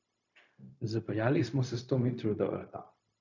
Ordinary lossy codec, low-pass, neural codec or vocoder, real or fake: none; 7.2 kHz; codec, 16 kHz, 0.4 kbps, LongCat-Audio-Codec; fake